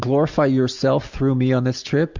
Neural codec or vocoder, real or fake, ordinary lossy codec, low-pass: none; real; Opus, 64 kbps; 7.2 kHz